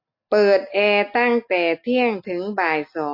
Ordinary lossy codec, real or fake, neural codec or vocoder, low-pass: MP3, 32 kbps; real; none; 5.4 kHz